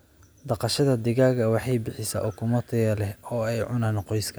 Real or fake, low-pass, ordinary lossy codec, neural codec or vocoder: real; none; none; none